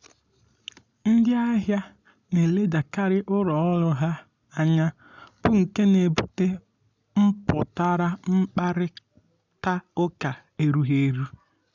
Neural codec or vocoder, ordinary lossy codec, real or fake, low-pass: none; none; real; 7.2 kHz